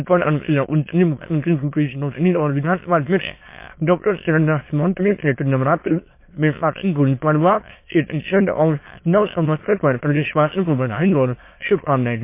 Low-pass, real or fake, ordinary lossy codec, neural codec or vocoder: 3.6 kHz; fake; MP3, 24 kbps; autoencoder, 22.05 kHz, a latent of 192 numbers a frame, VITS, trained on many speakers